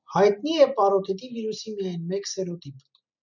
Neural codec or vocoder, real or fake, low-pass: none; real; 7.2 kHz